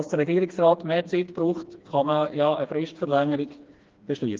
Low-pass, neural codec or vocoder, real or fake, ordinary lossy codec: 7.2 kHz; codec, 16 kHz, 2 kbps, FreqCodec, smaller model; fake; Opus, 24 kbps